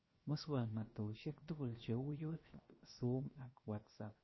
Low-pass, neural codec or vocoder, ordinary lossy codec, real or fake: 7.2 kHz; codec, 16 kHz, 0.7 kbps, FocalCodec; MP3, 24 kbps; fake